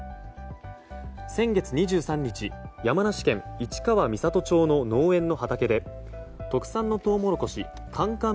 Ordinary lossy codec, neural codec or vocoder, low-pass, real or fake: none; none; none; real